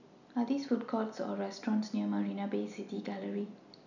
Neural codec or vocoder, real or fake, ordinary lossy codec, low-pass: none; real; none; 7.2 kHz